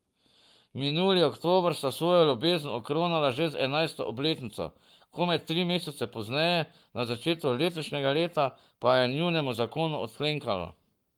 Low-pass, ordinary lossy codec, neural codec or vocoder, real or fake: 19.8 kHz; Opus, 32 kbps; codec, 44.1 kHz, 7.8 kbps, Pupu-Codec; fake